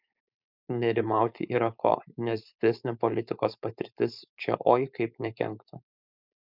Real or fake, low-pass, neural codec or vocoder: fake; 5.4 kHz; codec, 16 kHz, 4.8 kbps, FACodec